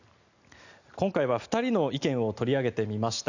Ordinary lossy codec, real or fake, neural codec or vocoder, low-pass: none; real; none; 7.2 kHz